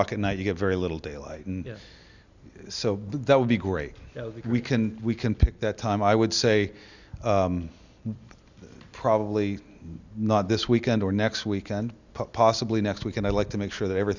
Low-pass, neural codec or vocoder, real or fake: 7.2 kHz; none; real